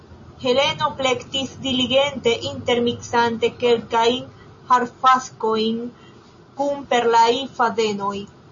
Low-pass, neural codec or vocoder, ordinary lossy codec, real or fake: 7.2 kHz; none; MP3, 32 kbps; real